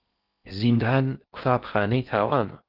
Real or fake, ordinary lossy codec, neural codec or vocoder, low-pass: fake; Opus, 32 kbps; codec, 16 kHz in and 24 kHz out, 0.6 kbps, FocalCodec, streaming, 4096 codes; 5.4 kHz